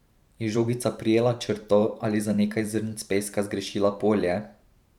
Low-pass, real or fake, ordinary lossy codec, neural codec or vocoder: 19.8 kHz; fake; none; vocoder, 44.1 kHz, 128 mel bands every 512 samples, BigVGAN v2